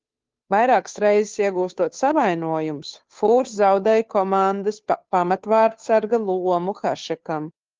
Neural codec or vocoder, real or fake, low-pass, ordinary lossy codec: codec, 16 kHz, 2 kbps, FunCodec, trained on Chinese and English, 25 frames a second; fake; 7.2 kHz; Opus, 32 kbps